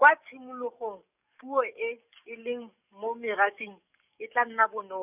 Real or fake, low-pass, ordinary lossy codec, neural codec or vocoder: fake; 3.6 kHz; none; codec, 44.1 kHz, 7.8 kbps, DAC